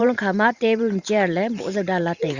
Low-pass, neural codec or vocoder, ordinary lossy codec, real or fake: 7.2 kHz; vocoder, 44.1 kHz, 128 mel bands every 512 samples, BigVGAN v2; Opus, 64 kbps; fake